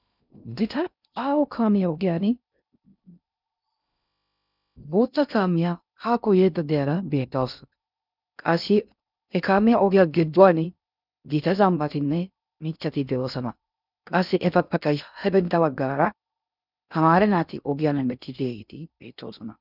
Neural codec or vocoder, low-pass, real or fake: codec, 16 kHz in and 24 kHz out, 0.6 kbps, FocalCodec, streaming, 2048 codes; 5.4 kHz; fake